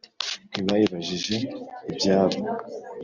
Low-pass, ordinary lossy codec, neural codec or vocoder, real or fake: 7.2 kHz; Opus, 64 kbps; none; real